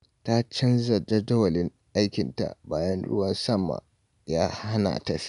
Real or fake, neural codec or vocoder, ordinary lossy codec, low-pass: real; none; none; 10.8 kHz